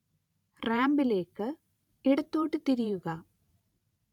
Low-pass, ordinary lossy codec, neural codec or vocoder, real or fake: 19.8 kHz; none; vocoder, 44.1 kHz, 128 mel bands every 512 samples, BigVGAN v2; fake